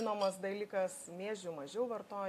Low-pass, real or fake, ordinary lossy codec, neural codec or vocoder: 14.4 kHz; real; AAC, 64 kbps; none